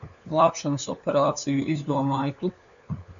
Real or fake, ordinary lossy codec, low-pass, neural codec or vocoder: fake; MP3, 96 kbps; 7.2 kHz; codec, 16 kHz, 4 kbps, FunCodec, trained on LibriTTS, 50 frames a second